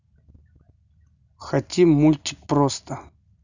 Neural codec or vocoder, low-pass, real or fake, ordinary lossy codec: none; 7.2 kHz; real; none